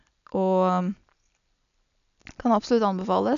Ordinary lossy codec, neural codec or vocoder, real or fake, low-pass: none; none; real; 7.2 kHz